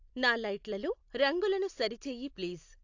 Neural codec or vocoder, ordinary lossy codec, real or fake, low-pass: none; AAC, 48 kbps; real; 7.2 kHz